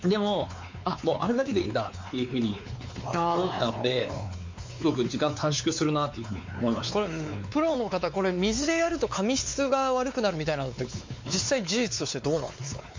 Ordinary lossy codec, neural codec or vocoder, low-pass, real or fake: MP3, 48 kbps; codec, 16 kHz, 4 kbps, X-Codec, WavLM features, trained on Multilingual LibriSpeech; 7.2 kHz; fake